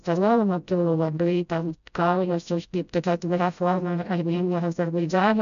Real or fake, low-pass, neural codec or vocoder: fake; 7.2 kHz; codec, 16 kHz, 0.5 kbps, FreqCodec, smaller model